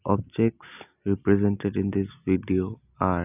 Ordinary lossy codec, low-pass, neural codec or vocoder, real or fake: none; 3.6 kHz; none; real